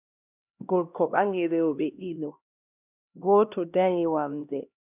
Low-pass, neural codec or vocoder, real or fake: 3.6 kHz; codec, 16 kHz, 1 kbps, X-Codec, HuBERT features, trained on LibriSpeech; fake